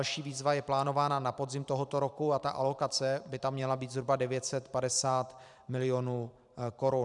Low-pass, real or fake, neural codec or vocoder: 10.8 kHz; fake; vocoder, 44.1 kHz, 128 mel bands every 512 samples, BigVGAN v2